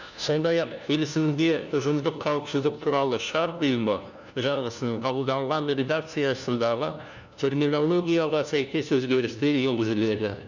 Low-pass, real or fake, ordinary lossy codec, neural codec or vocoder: 7.2 kHz; fake; none; codec, 16 kHz, 1 kbps, FunCodec, trained on LibriTTS, 50 frames a second